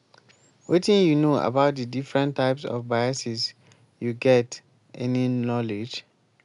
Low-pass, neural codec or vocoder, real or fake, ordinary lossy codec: 10.8 kHz; none; real; none